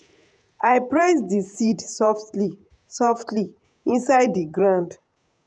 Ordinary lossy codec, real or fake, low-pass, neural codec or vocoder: none; fake; 9.9 kHz; vocoder, 48 kHz, 128 mel bands, Vocos